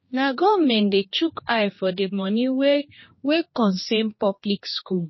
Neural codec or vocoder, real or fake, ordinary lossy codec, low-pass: codec, 16 kHz, 2 kbps, X-Codec, HuBERT features, trained on general audio; fake; MP3, 24 kbps; 7.2 kHz